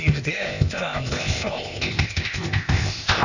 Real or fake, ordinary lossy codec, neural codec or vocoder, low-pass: fake; none; codec, 16 kHz, 0.8 kbps, ZipCodec; 7.2 kHz